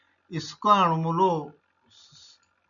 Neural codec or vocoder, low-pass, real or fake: none; 7.2 kHz; real